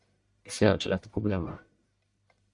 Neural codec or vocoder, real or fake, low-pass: codec, 44.1 kHz, 1.7 kbps, Pupu-Codec; fake; 10.8 kHz